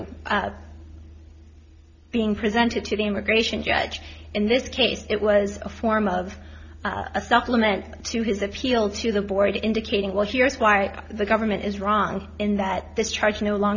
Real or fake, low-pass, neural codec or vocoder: real; 7.2 kHz; none